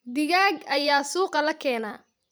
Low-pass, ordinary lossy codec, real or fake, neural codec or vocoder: none; none; fake; vocoder, 44.1 kHz, 128 mel bands every 512 samples, BigVGAN v2